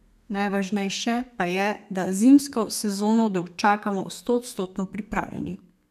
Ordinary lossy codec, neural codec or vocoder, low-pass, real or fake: none; codec, 32 kHz, 1.9 kbps, SNAC; 14.4 kHz; fake